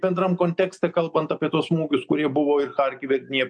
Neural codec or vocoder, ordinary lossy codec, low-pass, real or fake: none; MP3, 64 kbps; 9.9 kHz; real